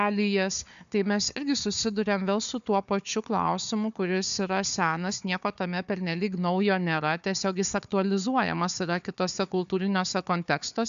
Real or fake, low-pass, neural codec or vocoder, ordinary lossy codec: fake; 7.2 kHz; codec, 16 kHz, 4 kbps, FunCodec, trained on Chinese and English, 50 frames a second; AAC, 96 kbps